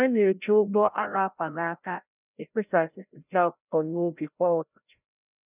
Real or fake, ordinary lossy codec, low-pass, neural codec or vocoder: fake; none; 3.6 kHz; codec, 16 kHz, 0.5 kbps, FreqCodec, larger model